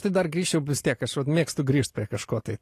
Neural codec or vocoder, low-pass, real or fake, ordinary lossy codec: none; 14.4 kHz; real; AAC, 48 kbps